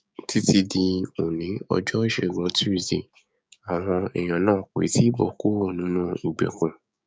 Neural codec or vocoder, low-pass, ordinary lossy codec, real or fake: codec, 16 kHz, 6 kbps, DAC; none; none; fake